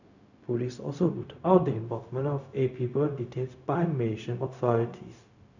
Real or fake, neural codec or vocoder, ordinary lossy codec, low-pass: fake; codec, 16 kHz, 0.4 kbps, LongCat-Audio-Codec; none; 7.2 kHz